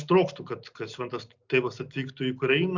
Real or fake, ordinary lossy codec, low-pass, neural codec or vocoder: real; Opus, 64 kbps; 7.2 kHz; none